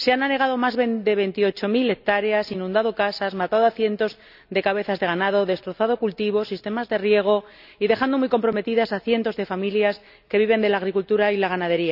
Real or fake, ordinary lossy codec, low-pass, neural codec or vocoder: real; none; 5.4 kHz; none